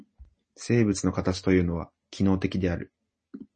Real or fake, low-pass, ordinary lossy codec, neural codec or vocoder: real; 10.8 kHz; MP3, 32 kbps; none